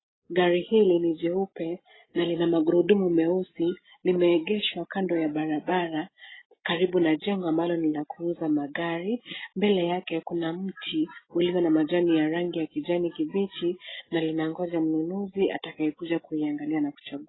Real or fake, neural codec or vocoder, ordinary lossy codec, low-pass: real; none; AAC, 16 kbps; 7.2 kHz